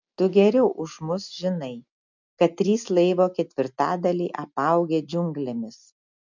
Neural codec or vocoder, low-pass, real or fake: none; 7.2 kHz; real